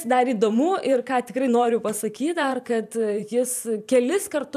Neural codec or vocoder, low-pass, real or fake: vocoder, 44.1 kHz, 128 mel bands every 512 samples, BigVGAN v2; 14.4 kHz; fake